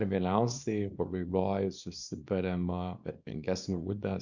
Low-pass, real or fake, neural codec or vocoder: 7.2 kHz; fake; codec, 24 kHz, 0.9 kbps, WavTokenizer, small release